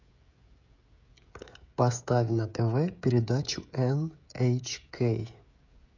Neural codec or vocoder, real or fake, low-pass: codec, 16 kHz, 16 kbps, FreqCodec, smaller model; fake; 7.2 kHz